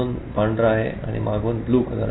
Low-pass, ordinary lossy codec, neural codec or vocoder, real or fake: 7.2 kHz; AAC, 16 kbps; none; real